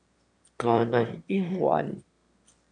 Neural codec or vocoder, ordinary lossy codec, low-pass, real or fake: autoencoder, 22.05 kHz, a latent of 192 numbers a frame, VITS, trained on one speaker; MP3, 64 kbps; 9.9 kHz; fake